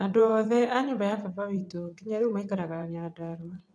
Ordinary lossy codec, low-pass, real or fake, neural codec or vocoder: none; none; fake; vocoder, 22.05 kHz, 80 mel bands, WaveNeXt